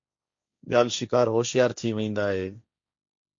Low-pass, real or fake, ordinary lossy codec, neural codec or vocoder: 7.2 kHz; fake; MP3, 48 kbps; codec, 16 kHz, 1.1 kbps, Voila-Tokenizer